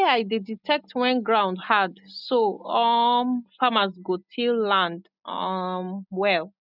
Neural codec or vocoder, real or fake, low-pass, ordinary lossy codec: none; real; 5.4 kHz; none